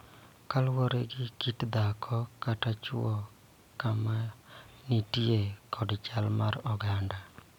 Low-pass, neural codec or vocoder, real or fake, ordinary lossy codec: 19.8 kHz; none; real; none